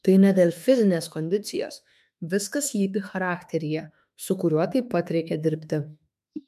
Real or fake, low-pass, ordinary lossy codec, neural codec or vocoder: fake; 14.4 kHz; MP3, 96 kbps; autoencoder, 48 kHz, 32 numbers a frame, DAC-VAE, trained on Japanese speech